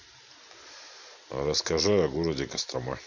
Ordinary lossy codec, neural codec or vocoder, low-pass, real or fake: none; vocoder, 44.1 kHz, 128 mel bands every 512 samples, BigVGAN v2; 7.2 kHz; fake